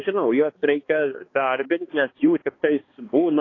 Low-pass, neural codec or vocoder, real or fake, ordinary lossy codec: 7.2 kHz; codec, 16 kHz, 2 kbps, X-Codec, HuBERT features, trained on balanced general audio; fake; AAC, 32 kbps